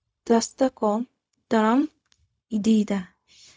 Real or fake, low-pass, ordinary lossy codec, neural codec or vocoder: fake; none; none; codec, 16 kHz, 0.4 kbps, LongCat-Audio-Codec